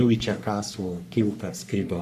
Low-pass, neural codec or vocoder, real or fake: 14.4 kHz; codec, 44.1 kHz, 3.4 kbps, Pupu-Codec; fake